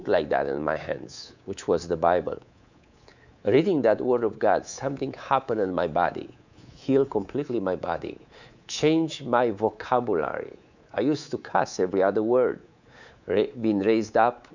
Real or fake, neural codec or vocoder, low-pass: fake; codec, 24 kHz, 3.1 kbps, DualCodec; 7.2 kHz